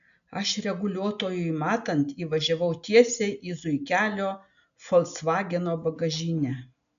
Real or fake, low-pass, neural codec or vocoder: real; 7.2 kHz; none